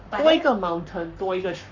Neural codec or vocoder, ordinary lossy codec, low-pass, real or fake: codec, 44.1 kHz, 7.8 kbps, Pupu-Codec; none; 7.2 kHz; fake